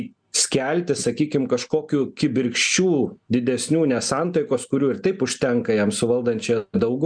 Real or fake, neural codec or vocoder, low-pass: real; none; 9.9 kHz